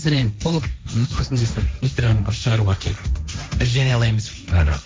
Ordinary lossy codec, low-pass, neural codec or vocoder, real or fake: none; none; codec, 16 kHz, 1.1 kbps, Voila-Tokenizer; fake